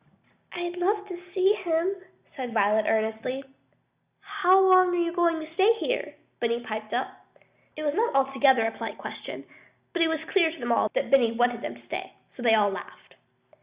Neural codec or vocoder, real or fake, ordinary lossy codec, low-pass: none; real; Opus, 64 kbps; 3.6 kHz